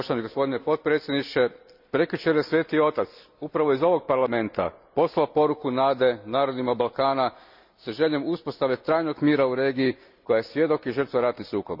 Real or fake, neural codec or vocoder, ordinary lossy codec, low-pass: real; none; none; 5.4 kHz